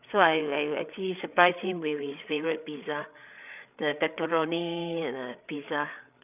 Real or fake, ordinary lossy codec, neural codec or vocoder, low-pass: fake; none; codec, 16 kHz, 8 kbps, FreqCodec, larger model; 3.6 kHz